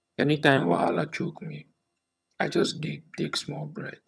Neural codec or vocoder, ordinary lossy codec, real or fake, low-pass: vocoder, 22.05 kHz, 80 mel bands, HiFi-GAN; none; fake; none